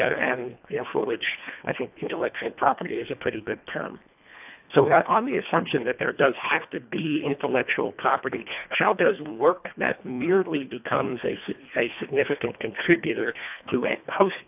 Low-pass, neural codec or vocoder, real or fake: 3.6 kHz; codec, 24 kHz, 1.5 kbps, HILCodec; fake